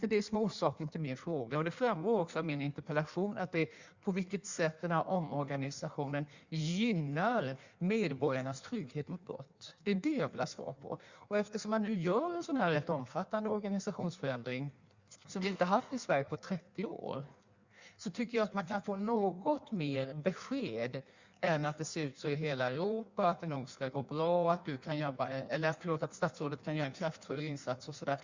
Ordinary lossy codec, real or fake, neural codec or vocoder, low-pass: Opus, 64 kbps; fake; codec, 16 kHz in and 24 kHz out, 1.1 kbps, FireRedTTS-2 codec; 7.2 kHz